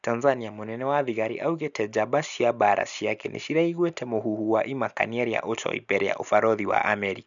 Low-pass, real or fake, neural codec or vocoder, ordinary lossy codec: 7.2 kHz; real; none; none